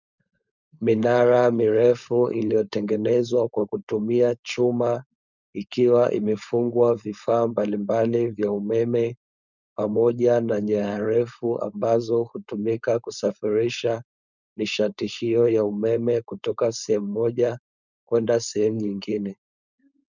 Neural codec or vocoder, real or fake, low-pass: codec, 16 kHz, 4.8 kbps, FACodec; fake; 7.2 kHz